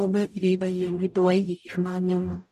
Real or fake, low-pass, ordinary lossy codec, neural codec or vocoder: fake; 14.4 kHz; none; codec, 44.1 kHz, 0.9 kbps, DAC